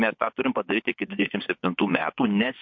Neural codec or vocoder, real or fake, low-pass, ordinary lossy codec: vocoder, 24 kHz, 100 mel bands, Vocos; fake; 7.2 kHz; MP3, 48 kbps